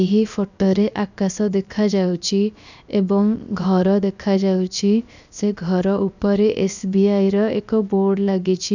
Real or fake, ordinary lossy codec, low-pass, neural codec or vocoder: fake; none; 7.2 kHz; codec, 16 kHz, 0.7 kbps, FocalCodec